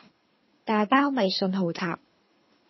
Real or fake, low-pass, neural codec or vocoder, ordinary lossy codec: fake; 7.2 kHz; codec, 24 kHz, 3 kbps, HILCodec; MP3, 24 kbps